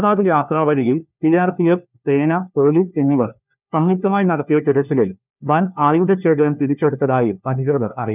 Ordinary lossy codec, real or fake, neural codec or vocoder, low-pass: none; fake; codec, 16 kHz, 1 kbps, FunCodec, trained on LibriTTS, 50 frames a second; 3.6 kHz